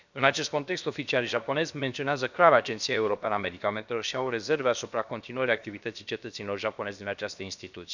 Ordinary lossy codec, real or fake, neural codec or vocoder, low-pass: none; fake; codec, 16 kHz, about 1 kbps, DyCAST, with the encoder's durations; 7.2 kHz